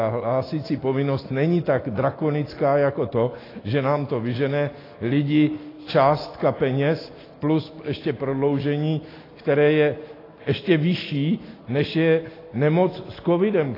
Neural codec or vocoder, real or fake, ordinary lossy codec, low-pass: none; real; AAC, 24 kbps; 5.4 kHz